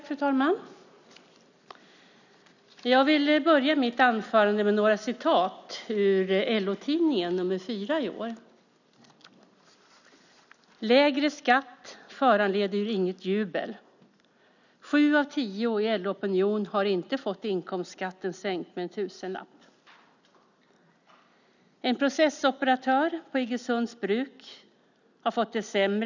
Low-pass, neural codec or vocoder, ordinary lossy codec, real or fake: 7.2 kHz; none; none; real